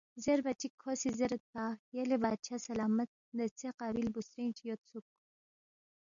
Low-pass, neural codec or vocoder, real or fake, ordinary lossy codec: 7.2 kHz; none; real; MP3, 96 kbps